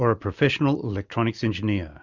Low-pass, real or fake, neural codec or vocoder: 7.2 kHz; real; none